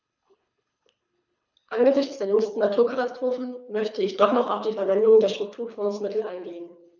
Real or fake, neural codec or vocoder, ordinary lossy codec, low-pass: fake; codec, 24 kHz, 3 kbps, HILCodec; none; 7.2 kHz